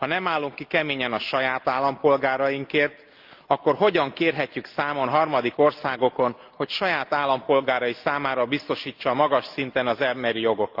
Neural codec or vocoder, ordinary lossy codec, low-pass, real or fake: none; Opus, 24 kbps; 5.4 kHz; real